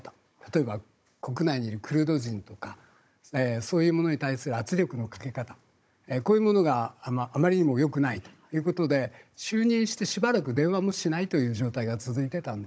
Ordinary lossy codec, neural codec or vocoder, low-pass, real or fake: none; codec, 16 kHz, 16 kbps, FunCodec, trained on Chinese and English, 50 frames a second; none; fake